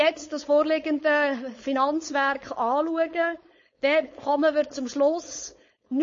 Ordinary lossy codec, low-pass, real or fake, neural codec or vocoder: MP3, 32 kbps; 7.2 kHz; fake; codec, 16 kHz, 4.8 kbps, FACodec